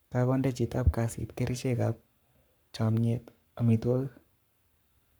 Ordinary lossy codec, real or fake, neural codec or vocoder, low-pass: none; fake; codec, 44.1 kHz, 7.8 kbps, Pupu-Codec; none